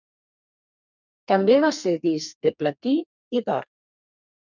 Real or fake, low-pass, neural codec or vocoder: fake; 7.2 kHz; codec, 32 kHz, 1.9 kbps, SNAC